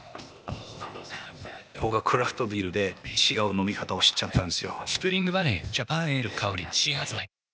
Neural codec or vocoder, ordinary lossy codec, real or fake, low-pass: codec, 16 kHz, 0.8 kbps, ZipCodec; none; fake; none